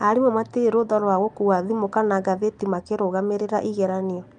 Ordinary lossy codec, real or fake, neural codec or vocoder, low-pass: Opus, 32 kbps; real; none; 9.9 kHz